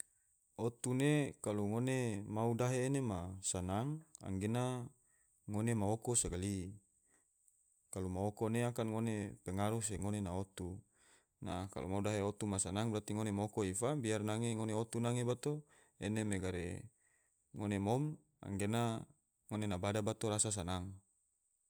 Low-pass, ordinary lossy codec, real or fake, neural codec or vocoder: none; none; real; none